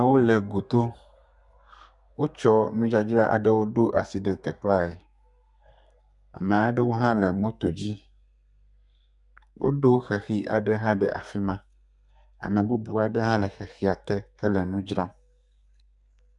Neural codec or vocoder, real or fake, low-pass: codec, 32 kHz, 1.9 kbps, SNAC; fake; 10.8 kHz